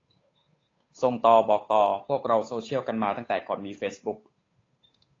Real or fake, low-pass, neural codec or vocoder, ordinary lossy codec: fake; 7.2 kHz; codec, 16 kHz, 8 kbps, FunCodec, trained on Chinese and English, 25 frames a second; AAC, 32 kbps